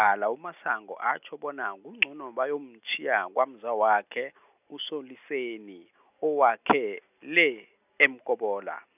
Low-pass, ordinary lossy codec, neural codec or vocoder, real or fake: 3.6 kHz; none; none; real